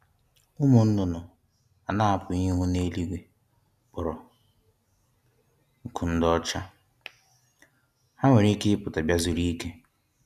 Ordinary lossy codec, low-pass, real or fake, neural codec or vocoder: none; 14.4 kHz; real; none